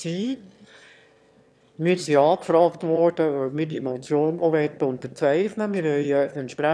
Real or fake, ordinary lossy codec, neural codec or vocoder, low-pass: fake; none; autoencoder, 22.05 kHz, a latent of 192 numbers a frame, VITS, trained on one speaker; none